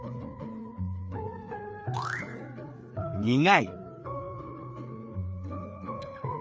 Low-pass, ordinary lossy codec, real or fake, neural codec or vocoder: none; none; fake; codec, 16 kHz, 4 kbps, FreqCodec, larger model